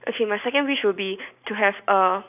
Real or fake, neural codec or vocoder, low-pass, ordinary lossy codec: real; none; 3.6 kHz; none